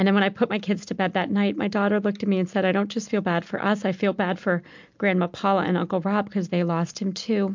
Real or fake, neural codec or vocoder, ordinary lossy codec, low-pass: real; none; MP3, 64 kbps; 7.2 kHz